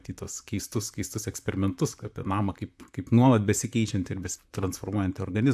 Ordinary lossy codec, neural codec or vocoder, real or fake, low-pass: AAC, 96 kbps; vocoder, 44.1 kHz, 128 mel bands, Pupu-Vocoder; fake; 14.4 kHz